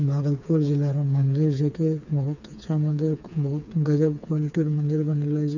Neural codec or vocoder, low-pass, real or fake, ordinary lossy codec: codec, 16 kHz, 4 kbps, FreqCodec, smaller model; 7.2 kHz; fake; none